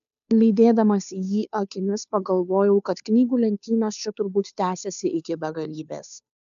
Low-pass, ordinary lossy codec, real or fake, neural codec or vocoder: 7.2 kHz; AAC, 96 kbps; fake; codec, 16 kHz, 2 kbps, FunCodec, trained on Chinese and English, 25 frames a second